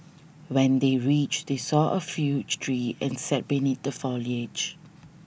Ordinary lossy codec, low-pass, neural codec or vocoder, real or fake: none; none; none; real